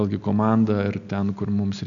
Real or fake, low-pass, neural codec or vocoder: real; 7.2 kHz; none